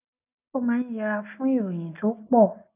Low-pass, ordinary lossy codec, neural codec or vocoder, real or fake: 3.6 kHz; none; none; real